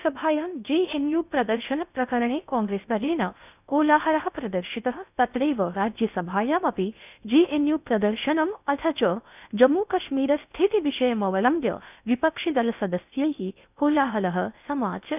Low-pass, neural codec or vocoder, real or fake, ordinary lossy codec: 3.6 kHz; codec, 16 kHz in and 24 kHz out, 0.6 kbps, FocalCodec, streaming, 2048 codes; fake; none